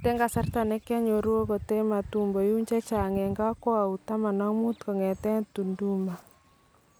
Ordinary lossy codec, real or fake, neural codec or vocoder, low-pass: none; real; none; none